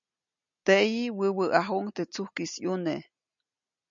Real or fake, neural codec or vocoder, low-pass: real; none; 7.2 kHz